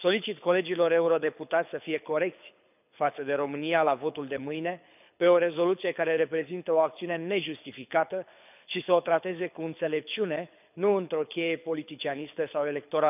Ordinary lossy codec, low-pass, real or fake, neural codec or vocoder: none; 3.6 kHz; fake; codec, 24 kHz, 6 kbps, HILCodec